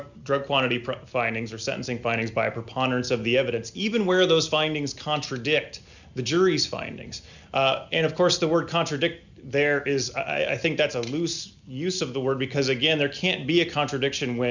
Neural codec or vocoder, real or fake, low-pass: none; real; 7.2 kHz